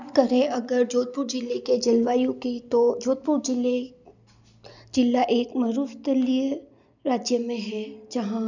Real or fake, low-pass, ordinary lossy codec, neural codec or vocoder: real; 7.2 kHz; none; none